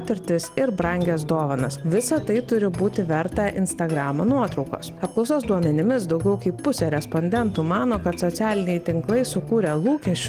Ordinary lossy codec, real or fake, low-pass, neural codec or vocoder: Opus, 24 kbps; real; 14.4 kHz; none